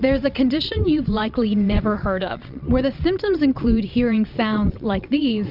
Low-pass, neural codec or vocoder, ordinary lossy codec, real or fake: 5.4 kHz; vocoder, 22.05 kHz, 80 mel bands, WaveNeXt; Opus, 64 kbps; fake